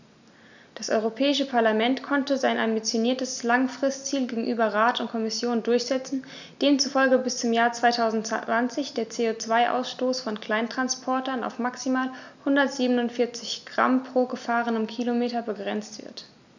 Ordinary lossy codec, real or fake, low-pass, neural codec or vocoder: none; real; 7.2 kHz; none